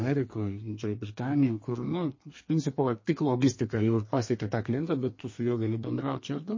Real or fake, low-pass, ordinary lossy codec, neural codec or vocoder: fake; 7.2 kHz; MP3, 32 kbps; codec, 32 kHz, 1.9 kbps, SNAC